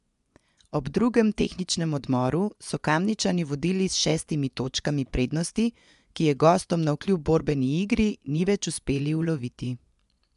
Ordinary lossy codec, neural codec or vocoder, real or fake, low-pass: MP3, 96 kbps; vocoder, 24 kHz, 100 mel bands, Vocos; fake; 10.8 kHz